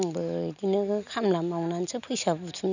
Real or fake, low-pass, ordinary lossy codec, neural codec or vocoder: real; 7.2 kHz; none; none